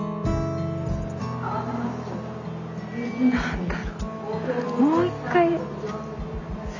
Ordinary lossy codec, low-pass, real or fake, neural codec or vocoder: none; 7.2 kHz; real; none